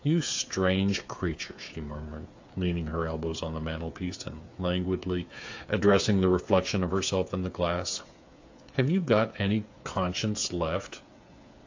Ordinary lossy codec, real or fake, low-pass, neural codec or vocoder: AAC, 48 kbps; fake; 7.2 kHz; codec, 16 kHz, 8 kbps, FreqCodec, smaller model